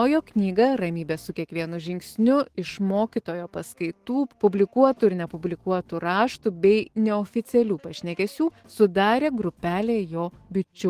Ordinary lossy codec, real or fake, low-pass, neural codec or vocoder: Opus, 16 kbps; fake; 14.4 kHz; autoencoder, 48 kHz, 128 numbers a frame, DAC-VAE, trained on Japanese speech